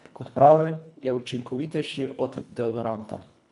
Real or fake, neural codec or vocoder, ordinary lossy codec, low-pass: fake; codec, 24 kHz, 1.5 kbps, HILCodec; none; 10.8 kHz